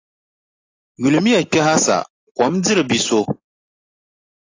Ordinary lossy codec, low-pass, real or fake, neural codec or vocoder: AAC, 32 kbps; 7.2 kHz; real; none